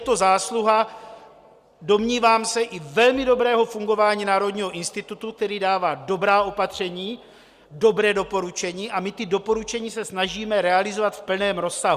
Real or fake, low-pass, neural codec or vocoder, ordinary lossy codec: real; 14.4 kHz; none; Opus, 64 kbps